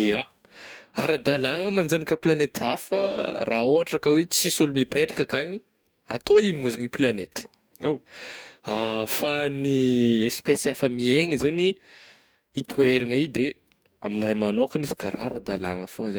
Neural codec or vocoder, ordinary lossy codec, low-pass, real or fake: codec, 44.1 kHz, 2.6 kbps, DAC; none; none; fake